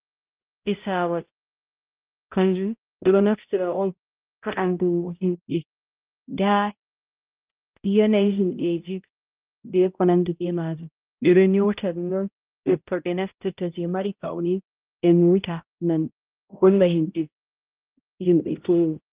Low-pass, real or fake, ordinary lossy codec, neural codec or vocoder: 3.6 kHz; fake; Opus, 32 kbps; codec, 16 kHz, 0.5 kbps, X-Codec, HuBERT features, trained on balanced general audio